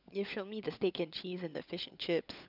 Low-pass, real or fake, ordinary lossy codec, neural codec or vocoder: 5.4 kHz; fake; none; codec, 16 kHz, 16 kbps, FunCodec, trained on LibriTTS, 50 frames a second